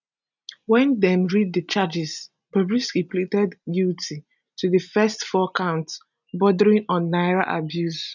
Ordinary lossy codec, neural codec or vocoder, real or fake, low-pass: none; none; real; 7.2 kHz